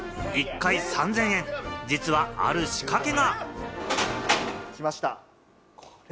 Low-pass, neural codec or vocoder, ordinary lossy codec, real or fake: none; none; none; real